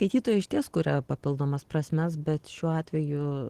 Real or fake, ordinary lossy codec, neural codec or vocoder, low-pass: real; Opus, 16 kbps; none; 14.4 kHz